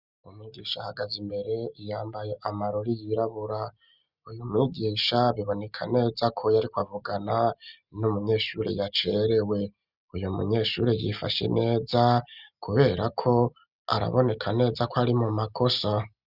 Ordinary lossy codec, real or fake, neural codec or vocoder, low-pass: Opus, 64 kbps; real; none; 5.4 kHz